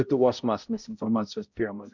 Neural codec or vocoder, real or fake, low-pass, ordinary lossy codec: codec, 16 kHz, 0.5 kbps, X-Codec, HuBERT features, trained on balanced general audio; fake; 7.2 kHz; MP3, 64 kbps